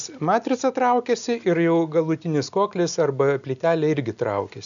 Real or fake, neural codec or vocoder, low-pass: real; none; 7.2 kHz